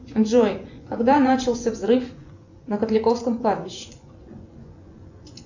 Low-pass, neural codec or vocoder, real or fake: 7.2 kHz; vocoder, 24 kHz, 100 mel bands, Vocos; fake